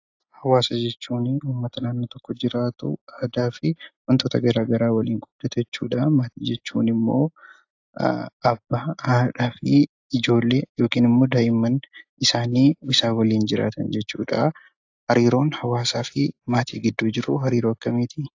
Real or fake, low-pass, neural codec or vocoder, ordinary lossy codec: real; 7.2 kHz; none; AAC, 48 kbps